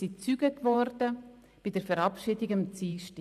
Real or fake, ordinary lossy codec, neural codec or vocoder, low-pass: fake; none; vocoder, 44.1 kHz, 128 mel bands every 512 samples, BigVGAN v2; 14.4 kHz